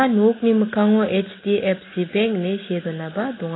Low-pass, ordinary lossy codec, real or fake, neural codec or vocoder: 7.2 kHz; AAC, 16 kbps; real; none